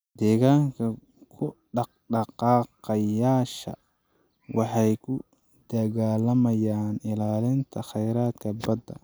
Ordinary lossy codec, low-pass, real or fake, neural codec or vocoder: none; none; real; none